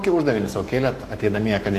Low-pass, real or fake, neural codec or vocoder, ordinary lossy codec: 14.4 kHz; fake; codec, 44.1 kHz, 7.8 kbps, Pupu-Codec; AAC, 64 kbps